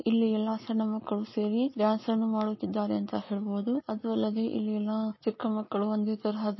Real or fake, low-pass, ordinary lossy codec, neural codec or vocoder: real; 7.2 kHz; MP3, 24 kbps; none